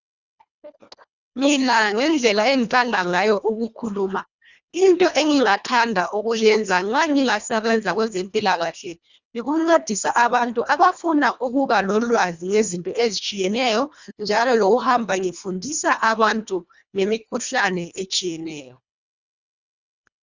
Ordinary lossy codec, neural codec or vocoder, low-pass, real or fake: Opus, 64 kbps; codec, 24 kHz, 1.5 kbps, HILCodec; 7.2 kHz; fake